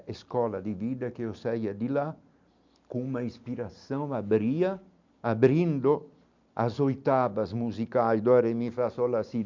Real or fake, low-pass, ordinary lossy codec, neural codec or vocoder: real; 7.2 kHz; MP3, 64 kbps; none